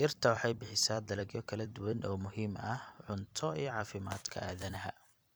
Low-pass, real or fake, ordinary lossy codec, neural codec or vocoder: none; fake; none; vocoder, 44.1 kHz, 128 mel bands every 256 samples, BigVGAN v2